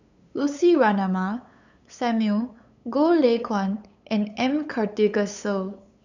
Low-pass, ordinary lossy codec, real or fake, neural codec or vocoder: 7.2 kHz; none; fake; codec, 16 kHz, 8 kbps, FunCodec, trained on LibriTTS, 25 frames a second